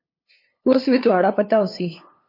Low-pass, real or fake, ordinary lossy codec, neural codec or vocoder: 5.4 kHz; fake; MP3, 32 kbps; codec, 16 kHz, 2 kbps, FunCodec, trained on LibriTTS, 25 frames a second